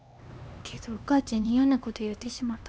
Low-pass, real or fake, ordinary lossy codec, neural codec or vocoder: none; fake; none; codec, 16 kHz, 2 kbps, X-Codec, HuBERT features, trained on LibriSpeech